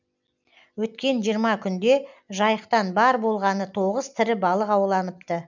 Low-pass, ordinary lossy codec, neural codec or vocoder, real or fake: 7.2 kHz; none; none; real